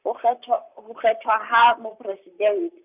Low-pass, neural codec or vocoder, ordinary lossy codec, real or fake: 3.6 kHz; vocoder, 44.1 kHz, 128 mel bands, Pupu-Vocoder; none; fake